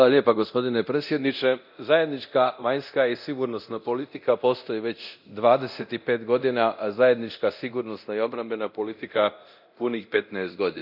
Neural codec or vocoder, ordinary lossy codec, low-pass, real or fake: codec, 24 kHz, 0.9 kbps, DualCodec; none; 5.4 kHz; fake